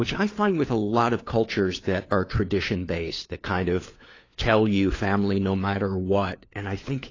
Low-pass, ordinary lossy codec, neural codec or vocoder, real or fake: 7.2 kHz; AAC, 32 kbps; codec, 16 kHz, 4 kbps, FunCodec, trained on LibriTTS, 50 frames a second; fake